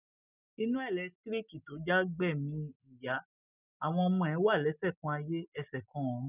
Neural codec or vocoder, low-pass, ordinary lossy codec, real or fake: none; 3.6 kHz; none; real